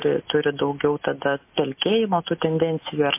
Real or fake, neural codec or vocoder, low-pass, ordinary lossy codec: real; none; 3.6 kHz; MP3, 24 kbps